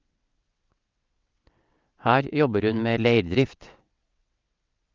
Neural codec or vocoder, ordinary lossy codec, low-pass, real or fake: vocoder, 22.05 kHz, 80 mel bands, WaveNeXt; Opus, 32 kbps; 7.2 kHz; fake